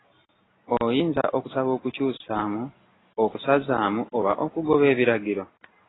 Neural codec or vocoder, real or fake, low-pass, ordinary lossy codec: none; real; 7.2 kHz; AAC, 16 kbps